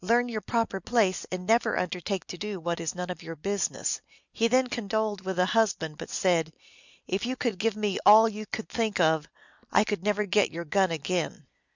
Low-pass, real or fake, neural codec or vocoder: 7.2 kHz; real; none